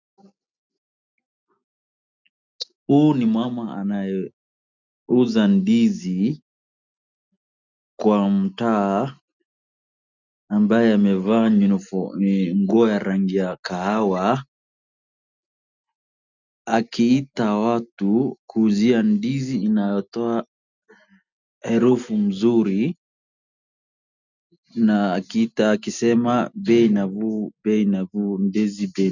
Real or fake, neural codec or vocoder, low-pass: real; none; 7.2 kHz